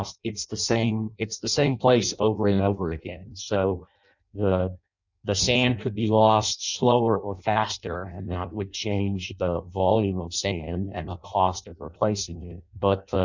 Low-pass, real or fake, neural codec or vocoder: 7.2 kHz; fake; codec, 16 kHz in and 24 kHz out, 0.6 kbps, FireRedTTS-2 codec